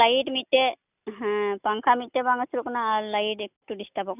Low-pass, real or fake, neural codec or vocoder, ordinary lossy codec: 3.6 kHz; real; none; none